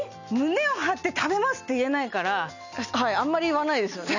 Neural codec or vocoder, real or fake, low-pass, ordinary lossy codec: none; real; 7.2 kHz; none